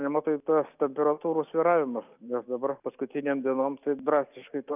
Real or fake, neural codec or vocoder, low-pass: real; none; 3.6 kHz